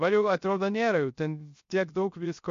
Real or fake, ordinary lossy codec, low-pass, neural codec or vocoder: fake; MP3, 48 kbps; 7.2 kHz; codec, 16 kHz, 0.3 kbps, FocalCodec